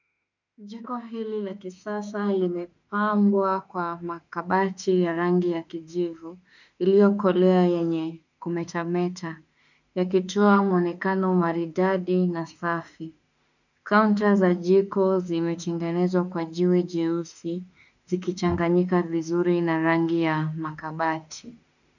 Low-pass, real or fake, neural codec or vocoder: 7.2 kHz; fake; autoencoder, 48 kHz, 32 numbers a frame, DAC-VAE, trained on Japanese speech